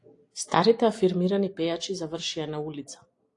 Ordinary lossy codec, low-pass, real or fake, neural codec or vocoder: AAC, 48 kbps; 10.8 kHz; real; none